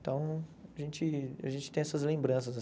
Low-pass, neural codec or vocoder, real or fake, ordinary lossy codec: none; none; real; none